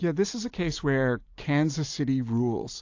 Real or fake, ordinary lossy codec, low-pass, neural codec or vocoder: real; AAC, 48 kbps; 7.2 kHz; none